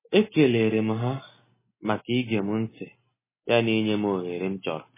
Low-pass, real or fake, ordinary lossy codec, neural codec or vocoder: 3.6 kHz; real; MP3, 16 kbps; none